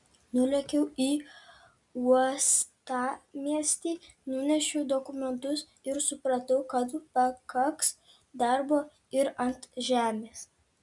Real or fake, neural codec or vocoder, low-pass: real; none; 10.8 kHz